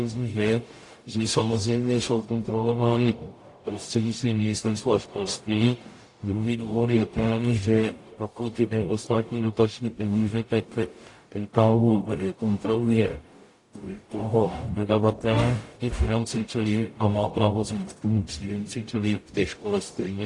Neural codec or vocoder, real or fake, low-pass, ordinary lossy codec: codec, 44.1 kHz, 0.9 kbps, DAC; fake; 10.8 kHz; AAC, 48 kbps